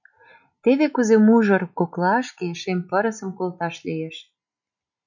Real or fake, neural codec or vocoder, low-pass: real; none; 7.2 kHz